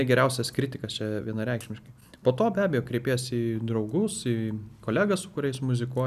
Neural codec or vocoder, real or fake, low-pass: none; real; 14.4 kHz